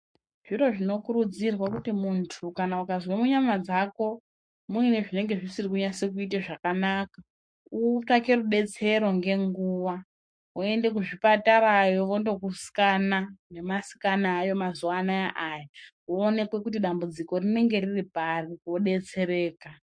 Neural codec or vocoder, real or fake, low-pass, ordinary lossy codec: codec, 44.1 kHz, 7.8 kbps, Pupu-Codec; fake; 9.9 kHz; MP3, 48 kbps